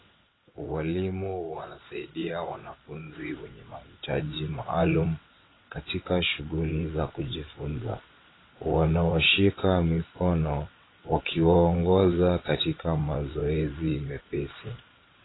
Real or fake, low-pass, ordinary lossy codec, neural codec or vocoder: fake; 7.2 kHz; AAC, 16 kbps; vocoder, 22.05 kHz, 80 mel bands, WaveNeXt